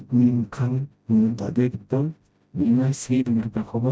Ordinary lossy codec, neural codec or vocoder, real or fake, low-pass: none; codec, 16 kHz, 0.5 kbps, FreqCodec, smaller model; fake; none